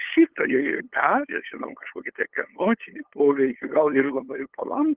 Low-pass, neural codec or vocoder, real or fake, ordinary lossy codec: 3.6 kHz; codec, 16 kHz, 8 kbps, FunCodec, trained on LibriTTS, 25 frames a second; fake; Opus, 16 kbps